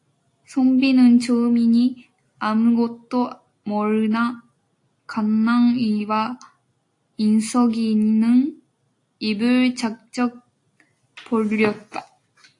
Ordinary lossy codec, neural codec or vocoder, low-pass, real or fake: AAC, 48 kbps; none; 10.8 kHz; real